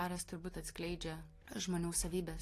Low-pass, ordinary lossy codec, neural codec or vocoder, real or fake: 14.4 kHz; AAC, 48 kbps; none; real